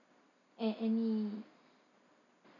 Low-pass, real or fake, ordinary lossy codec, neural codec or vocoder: 7.2 kHz; real; none; none